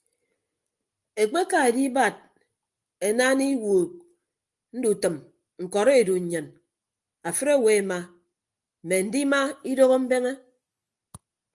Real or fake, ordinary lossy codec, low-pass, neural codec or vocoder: real; Opus, 32 kbps; 10.8 kHz; none